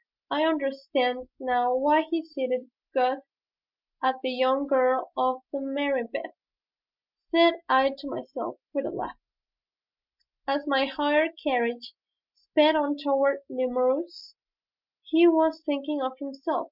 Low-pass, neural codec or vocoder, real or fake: 5.4 kHz; none; real